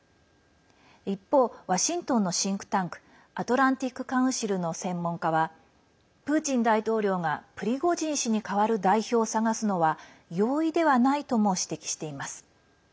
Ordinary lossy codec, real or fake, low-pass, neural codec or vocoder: none; real; none; none